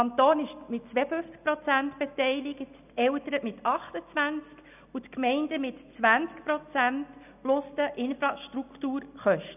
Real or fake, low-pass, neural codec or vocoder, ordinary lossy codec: real; 3.6 kHz; none; none